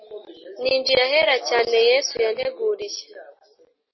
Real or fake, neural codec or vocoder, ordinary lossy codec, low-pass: real; none; MP3, 24 kbps; 7.2 kHz